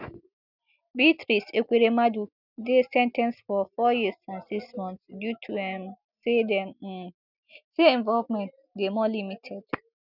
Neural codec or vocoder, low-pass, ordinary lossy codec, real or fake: none; 5.4 kHz; none; real